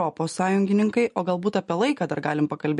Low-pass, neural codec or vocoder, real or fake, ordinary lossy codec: 14.4 kHz; none; real; MP3, 48 kbps